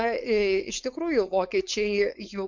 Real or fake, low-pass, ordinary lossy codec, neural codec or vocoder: fake; 7.2 kHz; MP3, 64 kbps; codec, 16 kHz, 4.8 kbps, FACodec